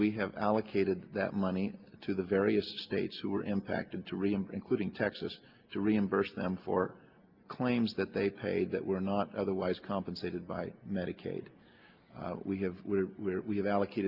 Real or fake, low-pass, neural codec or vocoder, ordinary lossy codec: real; 5.4 kHz; none; Opus, 32 kbps